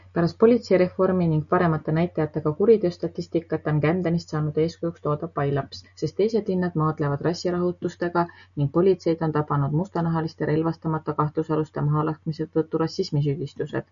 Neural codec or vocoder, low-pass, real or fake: none; 7.2 kHz; real